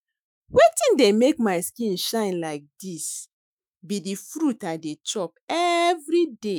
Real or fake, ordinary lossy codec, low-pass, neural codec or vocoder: fake; none; none; autoencoder, 48 kHz, 128 numbers a frame, DAC-VAE, trained on Japanese speech